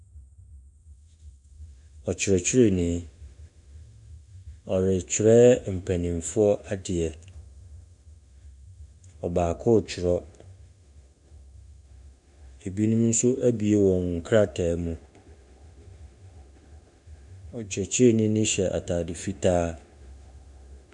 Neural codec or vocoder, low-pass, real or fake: autoencoder, 48 kHz, 32 numbers a frame, DAC-VAE, trained on Japanese speech; 10.8 kHz; fake